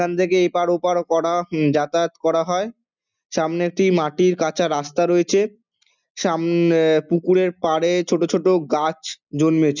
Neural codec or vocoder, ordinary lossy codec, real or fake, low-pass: none; none; real; 7.2 kHz